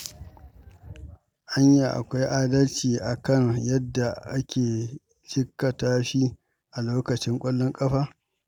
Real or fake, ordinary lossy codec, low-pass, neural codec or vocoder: real; none; 19.8 kHz; none